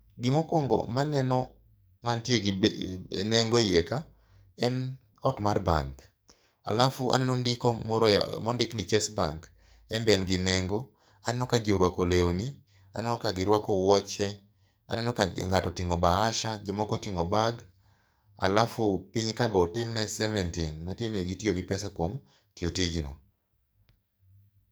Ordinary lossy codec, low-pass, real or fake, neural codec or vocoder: none; none; fake; codec, 44.1 kHz, 2.6 kbps, SNAC